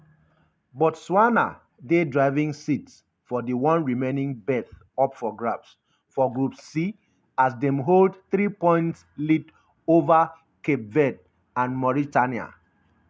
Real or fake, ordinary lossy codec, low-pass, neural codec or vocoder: real; none; none; none